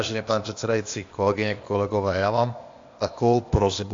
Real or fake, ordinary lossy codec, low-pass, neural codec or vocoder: fake; AAC, 48 kbps; 7.2 kHz; codec, 16 kHz, 0.8 kbps, ZipCodec